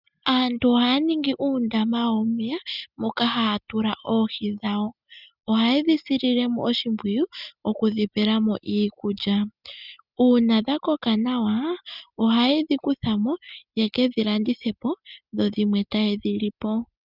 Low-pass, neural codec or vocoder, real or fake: 5.4 kHz; none; real